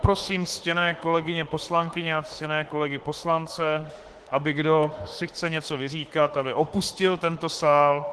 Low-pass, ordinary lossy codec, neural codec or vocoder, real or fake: 10.8 kHz; Opus, 16 kbps; autoencoder, 48 kHz, 32 numbers a frame, DAC-VAE, trained on Japanese speech; fake